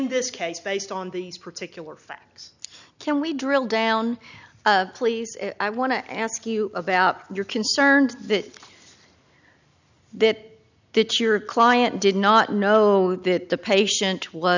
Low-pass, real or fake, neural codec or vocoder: 7.2 kHz; real; none